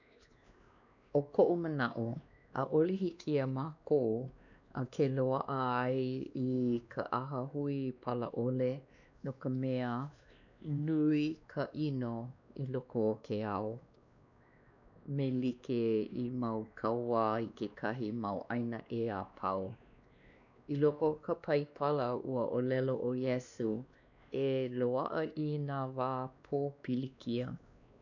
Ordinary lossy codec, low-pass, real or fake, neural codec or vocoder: none; 7.2 kHz; fake; codec, 16 kHz, 2 kbps, X-Codec, WavLM features, trained on Multilingual LibriSpeech